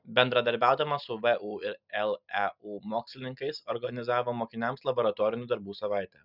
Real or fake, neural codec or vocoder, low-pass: real; none; 5.4 kHz